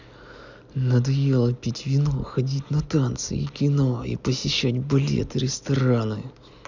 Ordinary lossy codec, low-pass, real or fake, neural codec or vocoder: none; 7.2 kHz; real; none